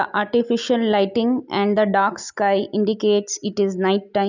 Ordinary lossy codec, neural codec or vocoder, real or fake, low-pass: none; none; real; 7.2 kHz